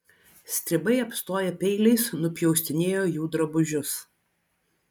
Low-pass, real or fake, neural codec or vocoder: 19.8 kHz; real; none